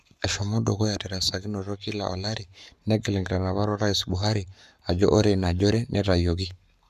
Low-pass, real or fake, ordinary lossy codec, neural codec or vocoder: 14.4 kHz; fake; none; codec, 44.1 kHz, 7.8 kbps, Pupu-Codec